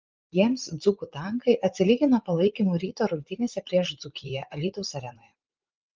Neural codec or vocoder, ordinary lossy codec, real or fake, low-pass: vocoder, 44.1 kHz, 80 mel bands, Vocos; Opus, 32 kbps; fake; 7.2 kHz